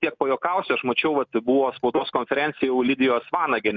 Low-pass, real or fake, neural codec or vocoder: 7.2 kHz; real; none